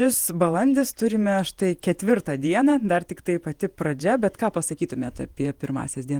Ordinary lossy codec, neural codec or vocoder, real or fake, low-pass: Opus, 24 kbps; vocoder, 48 kHz, 128 mel bands, Vocos; fake; 19.8 kHz